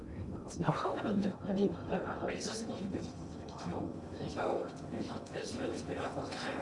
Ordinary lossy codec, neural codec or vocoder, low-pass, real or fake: Opus, 64 kbps; codec, 16 kHz in and 24 kHz out, 0.6 kbps, FocalCodec, streaming, 2048 codes; 10.8 kHz; fake